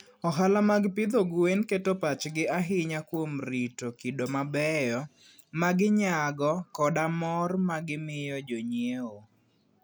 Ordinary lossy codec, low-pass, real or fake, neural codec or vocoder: none; none; real; none